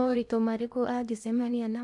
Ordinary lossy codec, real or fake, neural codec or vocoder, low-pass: none; fake; codec, 16 kHz in and 24 kHz out, 0.8 kbps, FocalCodec, streaming, 65536 codes; 10.8 kHz